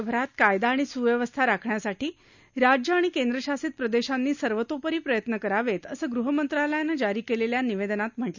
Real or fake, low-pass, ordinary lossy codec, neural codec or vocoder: real; 7.2 kHz; none; none